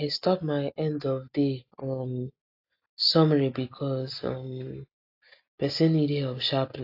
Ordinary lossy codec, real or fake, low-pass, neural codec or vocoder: AAC, 32 kbps; real; 5.4 kHz; none